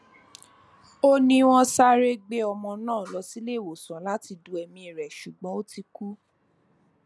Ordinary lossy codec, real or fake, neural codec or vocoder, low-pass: none; real; none; none